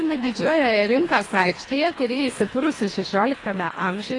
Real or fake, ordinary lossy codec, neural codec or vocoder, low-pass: fake; AAC, 32 kbps; codec, 24 kHz, 1.5 kbps, HILCodec; 10.8 kHz